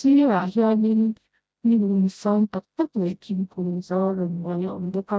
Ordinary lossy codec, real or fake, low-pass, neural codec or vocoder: none; fake; none; codec, 16 kHz, 0.5 kbps, FreqCodec, smaller model